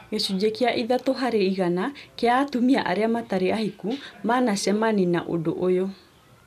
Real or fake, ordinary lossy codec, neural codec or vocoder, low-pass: real; none; none; 14.4 kHz